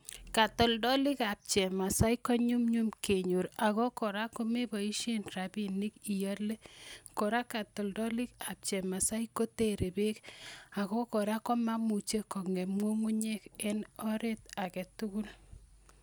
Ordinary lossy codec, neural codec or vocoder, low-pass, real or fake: none; none; none; real